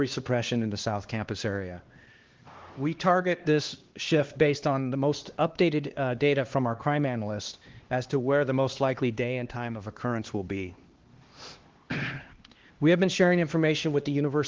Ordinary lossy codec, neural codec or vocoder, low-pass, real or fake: Opus, 16 kbps; codec, 16 kHz, 2 kbps, X-Codec, HuBERT features, trained on LibriSpeech; 7.2 kHz; fake